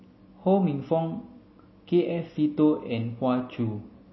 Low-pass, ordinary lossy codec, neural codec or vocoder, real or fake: 7.2 kHz; MP3, 24 kbps; none; real